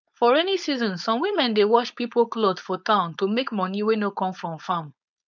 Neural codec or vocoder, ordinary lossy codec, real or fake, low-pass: codec, 16 kHz, 4.8 kbps, FACodec; none; fake; 7.2 kHz